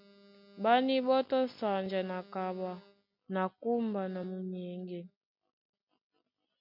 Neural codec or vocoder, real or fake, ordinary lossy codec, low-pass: none; real; MP3, 48 kbps; 5.4 kHz